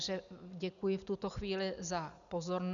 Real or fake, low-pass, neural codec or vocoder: real; 7.2 kHz; none